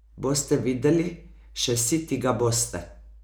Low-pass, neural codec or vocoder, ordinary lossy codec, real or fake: none; none; none; real